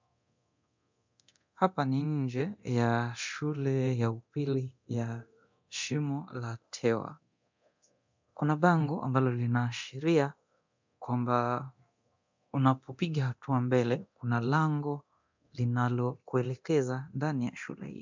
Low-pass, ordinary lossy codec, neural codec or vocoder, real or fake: 7.2 kHz; MP3, 64 kbps; codec, 24 kHz, 0.9 kbps, DualCodec; fake